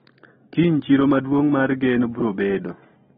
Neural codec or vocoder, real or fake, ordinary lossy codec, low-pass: none; real; AAC, 16 kbps; 19.8 kHz